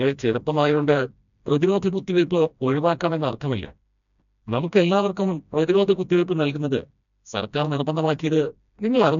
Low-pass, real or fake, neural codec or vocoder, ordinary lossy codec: 7.2 kHz; fake; codec, 16 kHz, 1 kbps, FreqCodec, smaller model; none